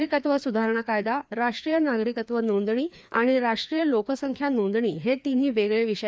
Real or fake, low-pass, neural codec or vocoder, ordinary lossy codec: fake; none; codec, 16 kHz, 2 kbps, FreqCodec, larger model; none